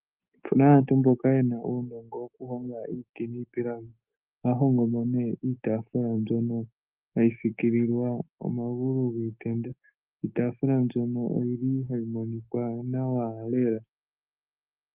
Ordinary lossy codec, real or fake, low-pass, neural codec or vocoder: Opus, 32 kbps; fake; 3.6 kHz; autoencoder, 48 kHz, 128 numbers a frame, DAC-VAE, trained on Japanese speech